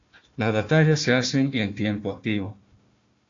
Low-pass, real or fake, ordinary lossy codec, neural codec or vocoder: 7.2 kHz; fake; AAC, 48 kbps; codec, 16 kHz, 1 kbps, FunCodec, trained on Chinese and English, 50 frames a second